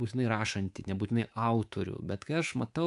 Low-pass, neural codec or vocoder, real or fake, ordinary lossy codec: 10.8 kHz; codec, 24 kHz, 3.1 kbps, DualCodec; fake; AAC, 64 kbps